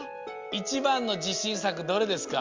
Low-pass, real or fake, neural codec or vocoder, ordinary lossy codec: 7.2 kHz; real; none; Opus, 32 kbps